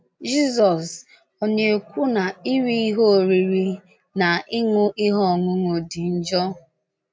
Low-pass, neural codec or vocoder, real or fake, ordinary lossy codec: none; none; real; none